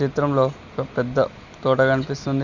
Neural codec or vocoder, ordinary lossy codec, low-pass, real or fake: none; Opus, 64 kbps; 7.2 kHz; real